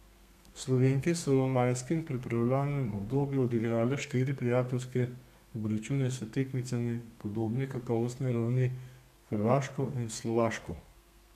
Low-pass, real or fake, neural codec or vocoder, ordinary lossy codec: 14.4 kHz; fake; codec, 32 kHz, 1.9 kbps, SNAC; none